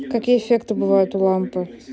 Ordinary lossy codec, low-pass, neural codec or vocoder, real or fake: none; none; none; real